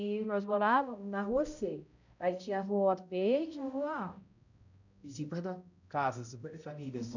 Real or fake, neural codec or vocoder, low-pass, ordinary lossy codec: fake; codec, 16 kHz, 0.5 kbps, X-Codec, HuBERT features, trained on balanced general audio; 7.2 kHz; none